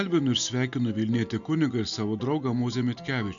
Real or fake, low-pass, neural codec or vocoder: real; 7.2 kHz; none